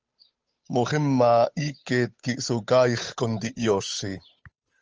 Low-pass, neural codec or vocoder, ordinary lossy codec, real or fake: 7.2 kHz; codec, 16 kHz, 8 kbps, FunCodec, trained on Chinese and English, 25 frames a second; Opus, 24 kbps; fake